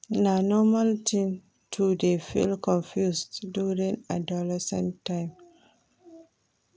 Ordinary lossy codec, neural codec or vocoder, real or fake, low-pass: none; none; real; none